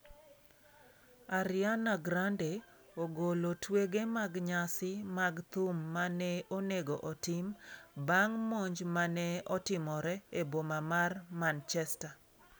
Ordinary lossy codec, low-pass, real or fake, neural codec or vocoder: none; none; real; none